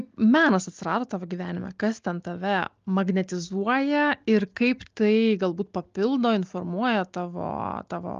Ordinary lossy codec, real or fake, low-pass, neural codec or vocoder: Opus, 32 kbps; real; 7.2 kHz; none